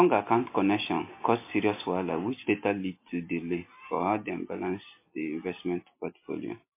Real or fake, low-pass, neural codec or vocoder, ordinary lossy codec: real; 3.6 kHz; none; MP3, 24 kbps